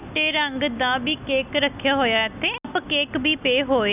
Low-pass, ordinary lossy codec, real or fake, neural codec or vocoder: 3.6 kHz; none; real; none